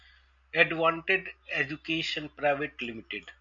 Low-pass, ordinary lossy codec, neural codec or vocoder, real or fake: 7.2 kHz; AAC, 64 kbps; none; real